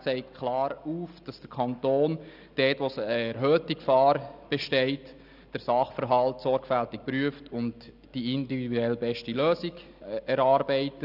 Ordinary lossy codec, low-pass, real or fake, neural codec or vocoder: none; 5.4 kHz; real; none